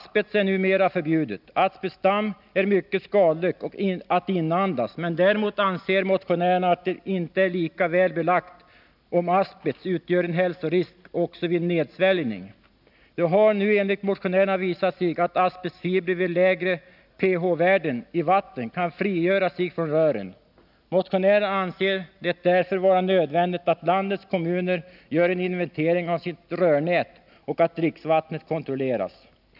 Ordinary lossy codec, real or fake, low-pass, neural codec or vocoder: none; real; 5.4 kHz; none